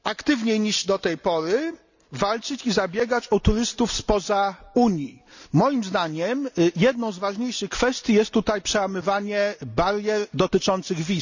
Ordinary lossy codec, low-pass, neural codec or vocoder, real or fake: none; 7.2 kHz; none; real